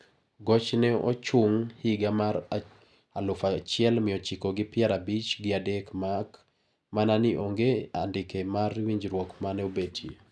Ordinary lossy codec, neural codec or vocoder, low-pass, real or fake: none; none; none; real